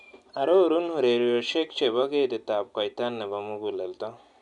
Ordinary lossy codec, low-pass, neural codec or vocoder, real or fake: none; 10.8 kHz; none; real